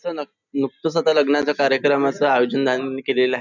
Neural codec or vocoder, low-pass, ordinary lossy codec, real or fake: none; 7.2 kHz; none; real